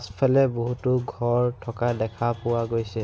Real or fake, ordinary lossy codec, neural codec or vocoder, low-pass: real; none; none; none